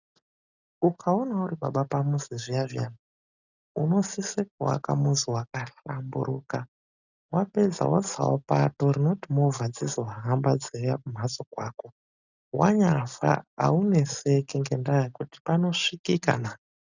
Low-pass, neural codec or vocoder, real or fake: 7.2 kHz; none; real